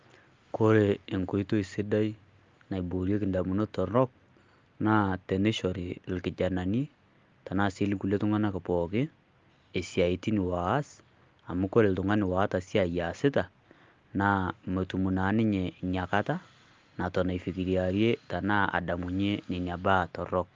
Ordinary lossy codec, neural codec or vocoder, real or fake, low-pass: Opus, 32 kbps; none; real; 7.2 kHz